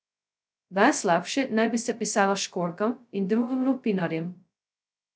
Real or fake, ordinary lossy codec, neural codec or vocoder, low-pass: fake; none; codec, 16 kHz, 0.2 kbps, FocalCodec; none